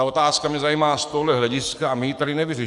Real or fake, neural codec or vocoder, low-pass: fake; codec, 44.1 kHz, 7.8 kbps, DAC; 10.8 kHz